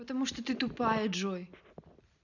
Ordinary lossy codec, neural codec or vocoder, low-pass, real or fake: none; none; 7.2 kHz; real